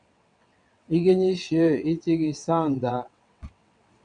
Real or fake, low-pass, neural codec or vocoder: fake; 9.9 kHz; vocoder, 22.05 kHz, 80 mel bands, WaveNeXt